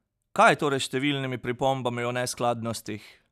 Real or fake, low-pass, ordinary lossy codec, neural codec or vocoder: real; 14.4 kHz; none; none